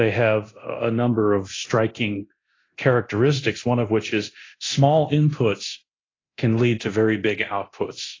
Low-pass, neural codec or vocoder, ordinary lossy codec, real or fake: 7.2 kHz; codec, 24 kHz, 0.9 kbps, DualCodec; AAC, 32 kbps; fake